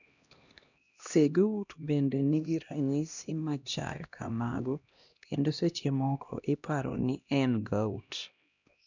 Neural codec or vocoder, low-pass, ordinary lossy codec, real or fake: codec, 16 kHz, 1 kbps, X-Codec, HuBERT features, trained on LibriSpeech; 7.2 kHz; none; fake